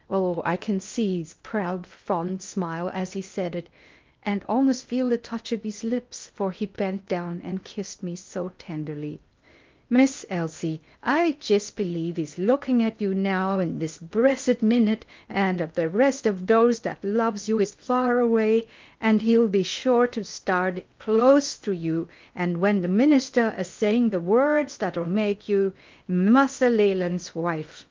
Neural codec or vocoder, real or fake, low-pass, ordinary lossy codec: codec, 16 kHz in and 24 kHz out, 0.6 kbps, FocalCodec, streaming, 2048 codes; fake; 7.2 kHz; Opus, 24 kbps